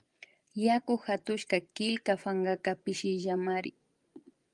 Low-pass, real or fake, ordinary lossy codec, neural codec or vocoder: 10.8 kHz; fake; Opus, 32 kbps; vocoder, 24 kHz, 100 mel bands, Vocos